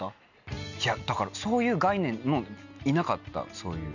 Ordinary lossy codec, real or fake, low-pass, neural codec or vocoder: none; real; 7.2 kHz; none